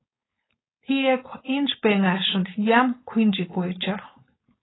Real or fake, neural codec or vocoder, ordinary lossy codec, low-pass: fake; codec, 16 kHz, 4.8 kbps, FACodec; AAC, 16 kbps; 7.2 kHz